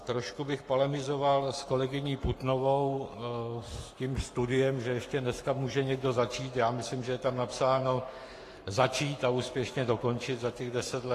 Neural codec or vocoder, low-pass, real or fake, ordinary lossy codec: codec, 44.1 kHz, 7.8 kbps, Pupu-Codec; 14.4 kHz; fake; AAC, 48 kbps